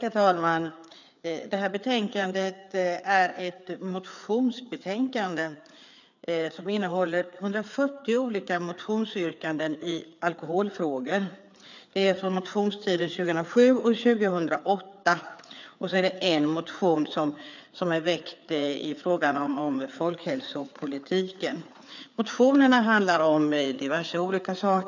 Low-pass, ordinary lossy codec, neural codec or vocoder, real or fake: 7.2 kHz; none; codec, 16 kHz, 4 kbps, FreqCodec, larger model; fake